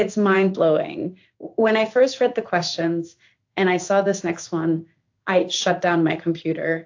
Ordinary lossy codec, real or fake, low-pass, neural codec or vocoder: AAC, 48 kbps; fake; 7.2 kHz; codec, 16 kHz in and 24 kHz out, 1 kbps, XY-Tokenizer